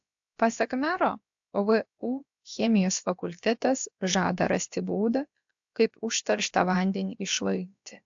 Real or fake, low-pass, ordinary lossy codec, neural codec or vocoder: fake; 7.2 kHz; AAC, 64 kbps; codec, 16 kHz, about 1 kbps, DyCAST, with the encoder's durations